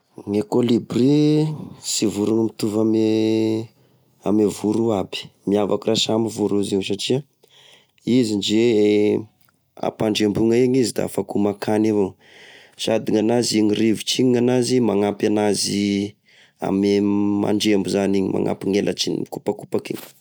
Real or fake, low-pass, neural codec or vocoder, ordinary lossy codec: real; none; none; none